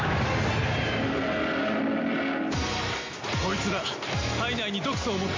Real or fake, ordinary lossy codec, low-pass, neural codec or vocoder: real; MP3, 48 kbps; 7.2 kHz; none